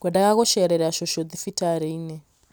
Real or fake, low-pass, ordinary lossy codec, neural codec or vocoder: real; none; none; none